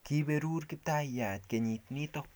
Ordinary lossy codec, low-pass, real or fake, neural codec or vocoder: none; none; real; none